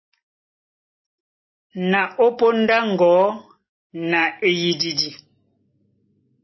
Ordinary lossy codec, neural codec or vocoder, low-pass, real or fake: MP3, 24 kbps; none; 7.2 kHz; real